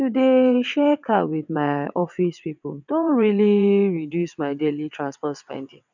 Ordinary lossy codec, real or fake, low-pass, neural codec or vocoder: none; fake; 7.2 kHz; vocoder, 22.05 kHz, 80 mel bands, WaveNeXt